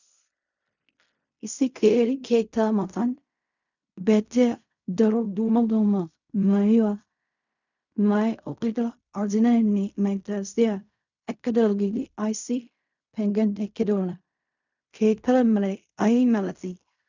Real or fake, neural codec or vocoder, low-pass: fake; codec, 16 kHz in and 24 kHz out, 0.4 kbps, LongCat-Audio-Codec, fine tuned four codebook decoder; 7.2 kHz